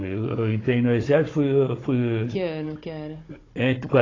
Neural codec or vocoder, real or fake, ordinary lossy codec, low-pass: codec, 16 kHz, 16 kbps, FunCodec, trained on Chinese and English, 50 frames a second; fake; AAC, 32 kbps; 7.2 kHz